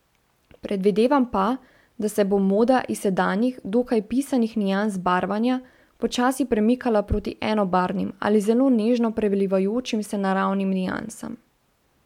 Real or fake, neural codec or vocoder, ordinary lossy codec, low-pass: real; none; MP3, 96 kbps; 19.8 kHz